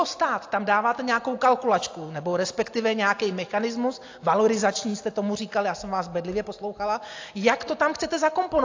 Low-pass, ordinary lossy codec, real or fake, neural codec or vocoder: 7.2 kHz; AAC, 48 kbps; real; none